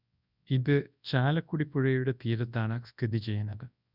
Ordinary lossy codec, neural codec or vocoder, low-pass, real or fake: none; codec, 24 kHz, 0.9 kbps, WavTokenizer, large speech release; 5.4 kHz; fake